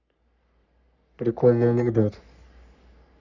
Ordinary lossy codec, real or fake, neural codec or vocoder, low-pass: none; fake; codec, 44.1 kHz, 3.4 kbps, Pupu-Codec; 7.2 kHz